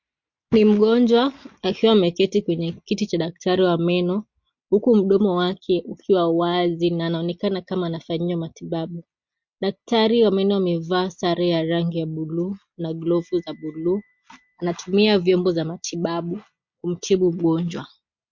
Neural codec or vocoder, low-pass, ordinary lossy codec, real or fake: none; 7.2 kHz; MP3, 48 kbps; real